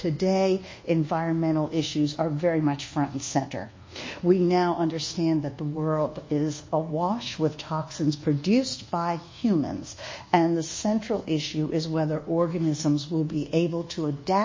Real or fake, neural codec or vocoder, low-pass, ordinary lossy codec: fake; codec, 24 kHz, 1.2 kbps, DualCodec; 7.2 kHz; MP3, 32 kbps